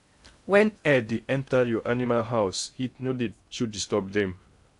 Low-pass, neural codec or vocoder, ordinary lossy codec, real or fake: 10.8 kHz; codec, 16 kHz in and 24 kHz out, 0.6 kbps, FocalCodec, streaming, 2048 codes; AAC, 64 kbps; fake